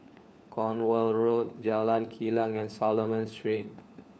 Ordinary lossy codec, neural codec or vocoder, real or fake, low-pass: none; codec, 16 kHz, 4 kbps, FunCodec, trained on LibriTTS, 50 frames a second; fake; none